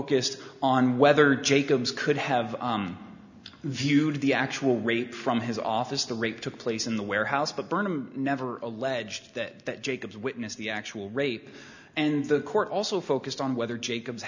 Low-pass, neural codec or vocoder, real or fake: 7.2 kHz; none; real